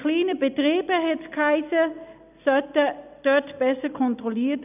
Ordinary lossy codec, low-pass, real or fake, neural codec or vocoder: none; 3.6 kHz; real; none